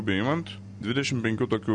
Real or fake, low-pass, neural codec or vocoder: real; 9.9 kHz; none